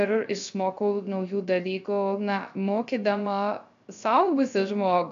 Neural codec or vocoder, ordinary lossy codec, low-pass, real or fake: codec, 16 kHz, 0.3 kbps, FocalCodec; MP3, 64 kbps; 7.2 kHz; fake